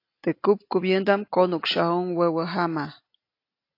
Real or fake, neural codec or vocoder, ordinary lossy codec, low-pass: real; none; AAC, 32 kbps; 5.4 kHz